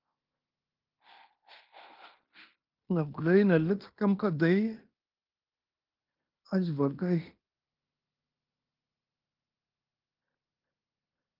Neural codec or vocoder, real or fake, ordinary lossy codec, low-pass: codec, 16 kHz in and 24 kHz out, 0.9 kbps, LongCat-Audio-Codec, fine tuned four codebook decoder; fake; Opus, 32 kbps; 5.4 kHz